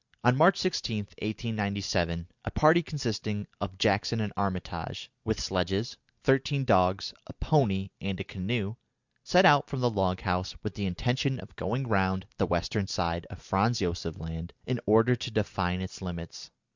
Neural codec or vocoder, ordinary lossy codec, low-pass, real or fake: none; Opus, 64 kbps; 7.2 kHz; real